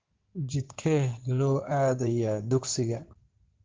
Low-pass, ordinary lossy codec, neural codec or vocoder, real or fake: 7.2 kHz; Opus, 16 kbps; codec, 16 kHz in and 24 kHz out, 2.2 kbps, FireRedTTS-2 codec; fake